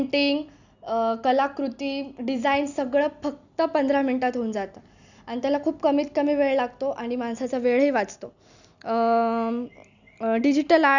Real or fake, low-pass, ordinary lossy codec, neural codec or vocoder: real; 7.2 kHz; none; none